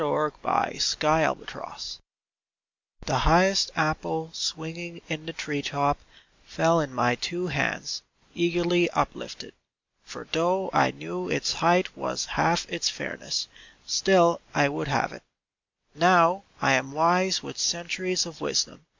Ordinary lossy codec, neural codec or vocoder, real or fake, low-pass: MP3, 64 kbps; none; real; 7.2 kHz